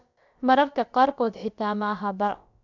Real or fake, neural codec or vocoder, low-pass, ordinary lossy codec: fake; codec, 16 kHz, about 1 kbps, DyCAST, with the encoder's durations; 7.2 kHz; none